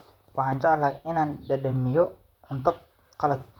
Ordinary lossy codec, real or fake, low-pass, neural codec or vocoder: none; fake; 19.8 kHz; vocoder, 44.1 kHz, 128 mel bands, Pupu-Vocoder